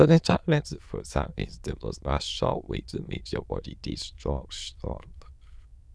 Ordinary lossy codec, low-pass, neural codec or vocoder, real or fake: none; none; autoencoder, 22.05 kHz, a latent of 192 numbers a frame, VITS, trained on many speakers; fake